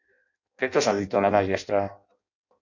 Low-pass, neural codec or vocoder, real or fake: 7.2 kHz; codec, 16 kHz in and 24 kHz out, 0.6 kbps, FireRedTTS-2 codec; fake